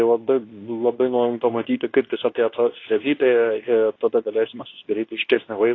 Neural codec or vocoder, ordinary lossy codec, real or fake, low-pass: codec, 24 kHz, 0.9 kbps, WavTokenizer, medium speech release version 2; AAC, 32 kbps; fake; 7.2 kHz